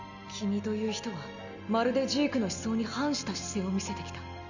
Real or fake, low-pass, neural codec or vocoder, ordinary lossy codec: real; 7.2 kHz; none; none